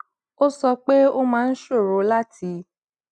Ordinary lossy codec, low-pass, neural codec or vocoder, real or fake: none; 10.8 kHz; none; real